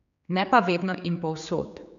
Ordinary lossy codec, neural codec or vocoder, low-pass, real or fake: none; codec, 16 kHz, 4 kbps, X-Codec, HuBERT features, trained on general audio; 7.2 kHz; fake